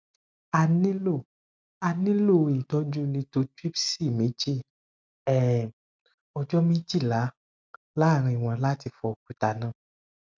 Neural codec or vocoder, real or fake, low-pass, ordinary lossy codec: none; real; none; none